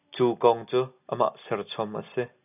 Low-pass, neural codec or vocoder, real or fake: 3.6 kHz; none; real